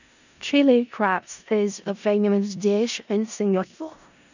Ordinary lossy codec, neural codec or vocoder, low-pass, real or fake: none; codec, 16 kHz in and 24 kHz out, 0.4 kbps, LongCat-Audio-Codec, four codebook decoder; 7.2 kHz; fake